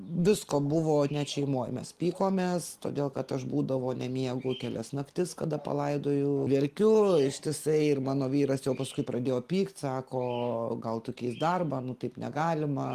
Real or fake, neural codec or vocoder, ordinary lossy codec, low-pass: real; none; Opus, 24 kbps; 14.4 kHz